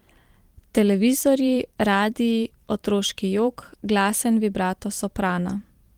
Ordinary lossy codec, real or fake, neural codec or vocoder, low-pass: Opus, 24 kbps; fake; vocoder, 44.1 kHz, 128 mel bands every 512 samples, BigVGAN v2; 19.8 kHz